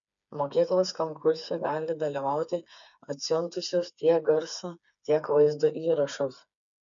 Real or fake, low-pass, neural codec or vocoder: fake; 7.2 kHz; codec, 16 kHz, 4 kbps, FreqCodec, smaller model